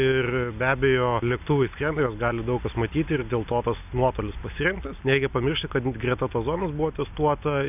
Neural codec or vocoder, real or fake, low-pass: none; real; 3.6 kHz